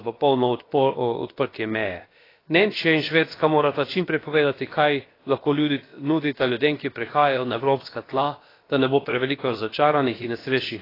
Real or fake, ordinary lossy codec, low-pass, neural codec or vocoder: fake; AAC, 24 kbps; 5.4 kHz; codec, 16 kHz, about 1 kbps, DyCAST, with the encoder's durations